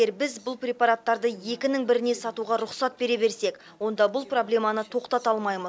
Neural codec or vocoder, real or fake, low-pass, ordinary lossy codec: none; real; none; none